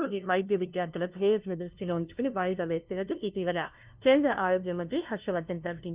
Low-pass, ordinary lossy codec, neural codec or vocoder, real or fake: 3.6 kHz; Opus, 32 kbps; codec, 16 kHz, 0.5 kbps, FunCodec, trained on LibriTTS, 25 frames a second; fake